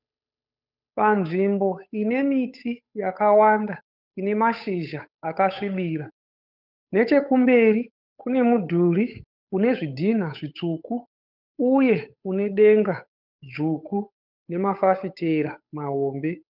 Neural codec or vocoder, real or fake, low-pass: codec, 16 kHz, 8 kbps, FunCodec, trained on Chinese and English, 25 frames a second; fake; 5.4 kHz